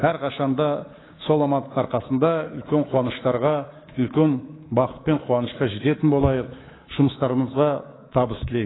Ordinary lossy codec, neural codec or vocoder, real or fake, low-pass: AAC, 16 kbps; codec, 24 kHz, 3.1 kbps, DualCodec; fake; 7.2 kHz